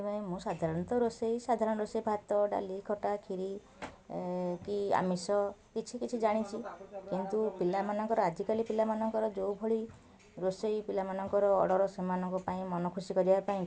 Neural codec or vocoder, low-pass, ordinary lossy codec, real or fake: none; none; none; real